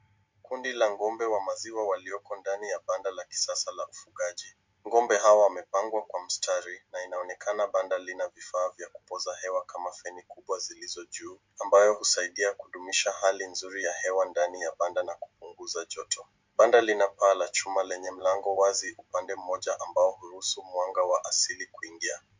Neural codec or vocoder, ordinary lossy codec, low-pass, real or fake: none; MP3, 64 kbps; 7.2 kHz; real